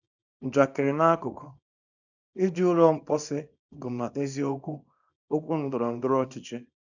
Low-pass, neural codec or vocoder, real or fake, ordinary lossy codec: 7.2 kHz; codec, 24 kHz, 0.9 kbps, WavTokenizer, small release; fake; none